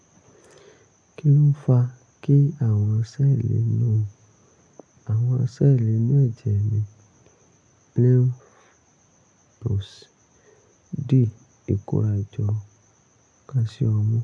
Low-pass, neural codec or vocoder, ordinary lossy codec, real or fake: 9.9 kHz; none; AAC, 64 kbps; real